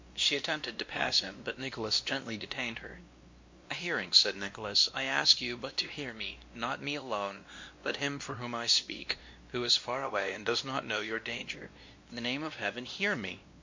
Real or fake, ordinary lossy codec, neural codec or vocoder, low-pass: fake; MP3, 48 kbps; codec, 16 kHz, 1 kbps, X-Codec, WavLM features, trained on Multilingual LibriSpeech; 7.2 kHz